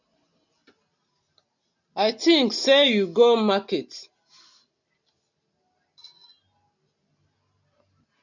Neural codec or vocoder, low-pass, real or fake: none; 7.2 kHz; real